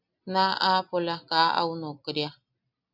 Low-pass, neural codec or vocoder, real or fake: 5.4 kHz; none; real